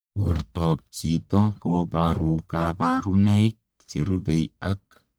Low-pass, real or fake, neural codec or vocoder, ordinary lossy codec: none; fake; codec, 44.1 kHz, 1.7 kbps, Pupu-Codec; none